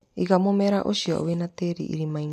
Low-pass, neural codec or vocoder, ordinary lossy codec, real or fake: 14.4 kHz; none; none; real